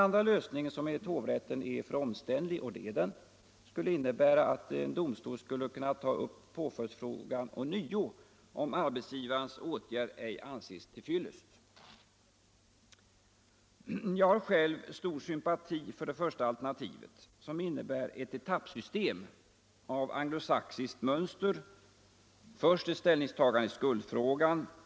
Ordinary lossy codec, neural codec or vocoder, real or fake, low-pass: none; none; real; none